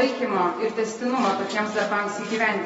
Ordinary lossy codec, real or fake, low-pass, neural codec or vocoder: AAC, 24 kbps; real; 19.8 kHz; none